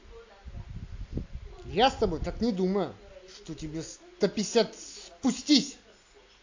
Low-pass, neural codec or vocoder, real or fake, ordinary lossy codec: 7.2 kHz; none; real; AAC, 48 kbps